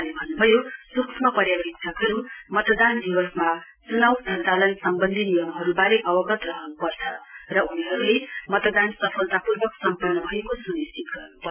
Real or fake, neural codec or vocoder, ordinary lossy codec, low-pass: real; none; none; 3.6 kHz